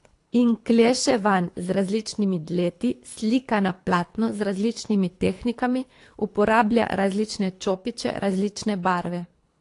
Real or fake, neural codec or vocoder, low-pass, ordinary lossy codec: fake; codec, 24 kHz, 3 kbps, HILCodec; 10.8 kHz; AAC, 48 kbps